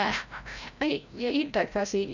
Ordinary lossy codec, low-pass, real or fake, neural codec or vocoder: none; 7.2 kHz; fake; codec, 16 kHz, 0.5 kbps, FreqCodec, larger model